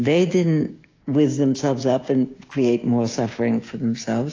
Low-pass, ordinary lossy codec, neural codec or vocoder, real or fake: 7.2 kHz; AAC, 32 kbps; codec, 16 kHz, 6 kbps, DAC; fake